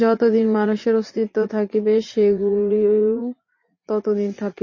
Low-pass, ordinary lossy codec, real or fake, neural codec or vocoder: 7.2 kHz; MP3, 32 kbps; fake; vocoder, 44.1 kHz, 80 mel bands, Vocos